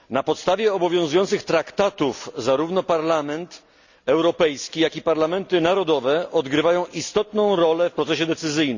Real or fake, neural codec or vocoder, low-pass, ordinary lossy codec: real; none; 7.2 kHz; Opus, 64 kbps